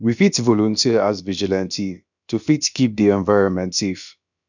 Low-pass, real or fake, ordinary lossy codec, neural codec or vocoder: 7.2 kHz; fake; none; codec, 16 kHz, about 1 kbps, DyCAST, with the encoder's durations